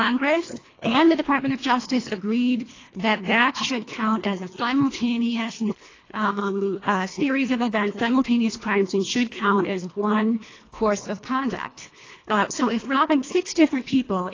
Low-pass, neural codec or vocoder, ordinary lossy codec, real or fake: 7.2 kHz; codec, 24 kHz, 1.5 kbps, HILCodec; AAC, 32 kbps; fake